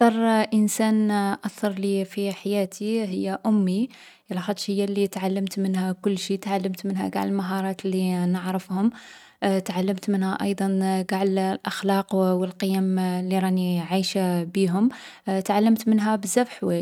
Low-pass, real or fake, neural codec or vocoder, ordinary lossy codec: 19.8 kHz; real; none; none